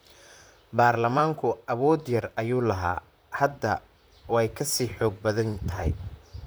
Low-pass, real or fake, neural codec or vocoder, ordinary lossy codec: none; fake; vocoder, 44.1 kHz, 128 mel bands, Pupu-Vocoder; none